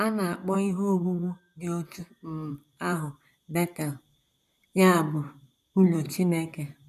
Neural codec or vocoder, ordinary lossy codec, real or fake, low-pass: vocoder, 44.1 kHz, 128 mel bands, Pupu-Vocoder; none; fake; 14.4 kHz